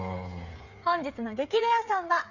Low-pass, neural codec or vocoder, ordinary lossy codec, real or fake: 7.2 kHz; codec, 16 kHz, 8 kbps, FreqCodec, smaller model; none; fake